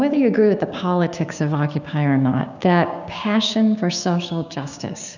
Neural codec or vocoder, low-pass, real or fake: codec, 16 kHz, 6 kbps, DAC; 7.2 kHz; fake